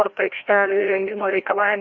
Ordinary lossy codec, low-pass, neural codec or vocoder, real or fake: Opus, 64 kbps; 7.2 kHz; codec, 16 kHz, 1 kbps, FreqCodec, larger model; fake